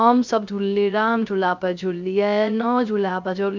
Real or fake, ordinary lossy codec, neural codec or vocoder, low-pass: fake; MP3, 64 kbps; codec, 16 kHz, 0.3 kbps, FocalCodec; 7.2 kHz